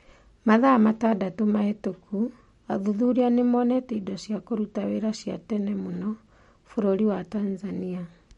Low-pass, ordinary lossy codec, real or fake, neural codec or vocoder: 10.8 kHz; MP3, 48 kbps; real; none